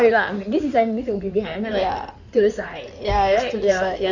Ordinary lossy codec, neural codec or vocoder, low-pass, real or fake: none; codec, 16 kHz in and 24 kHz out, 2.2 kbps, FireRedTTS-2 codec; 7.2 kHz; fake